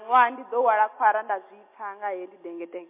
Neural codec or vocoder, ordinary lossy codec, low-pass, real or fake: none; MP3, 24 kbps; 3.6 kHz; real